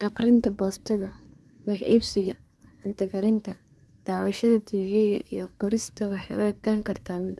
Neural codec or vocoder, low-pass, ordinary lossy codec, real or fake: codec, 24 kHz, 1 kbps, SNAC; none; none; fake